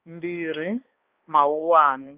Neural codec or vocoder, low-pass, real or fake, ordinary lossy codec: codec, 16 kHz, 2 kbps, X-Codec, HuBERT features, trained on balanced general audio; 3.6 kHz; fake; Opus, 32 kbps